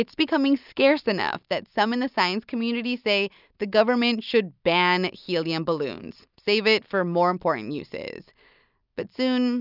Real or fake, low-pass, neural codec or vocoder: real; 5.4 kHz; none